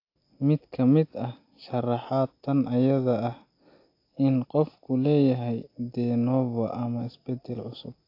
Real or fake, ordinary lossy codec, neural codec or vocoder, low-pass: real; AAC, 32 kbps; none; 5.4 kHz